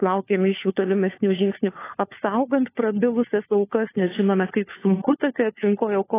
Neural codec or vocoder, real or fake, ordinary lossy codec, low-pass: codec, 16 kHz, 4 kbps, FunCodec, trained on LibriTTS, 50 frames a second; fake; AAC, 16 kbps; 3.6 kHz